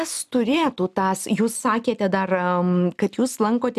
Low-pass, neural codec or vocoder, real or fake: 14.4 kHz; none; real